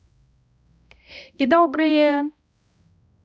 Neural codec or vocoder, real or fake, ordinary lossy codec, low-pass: codec, 16 kHz, 0.5 kbps, X-Codec, HuBERT features, trained on balanced general audio; fake; none; none